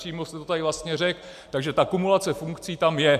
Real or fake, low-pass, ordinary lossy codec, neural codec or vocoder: real; 14.4 kHz; MP3, 96 kbps; none